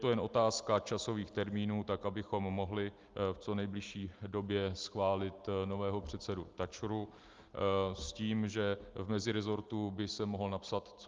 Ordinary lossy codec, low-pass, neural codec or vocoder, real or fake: Opus, 32 kbps; 7.2 kHz; none; real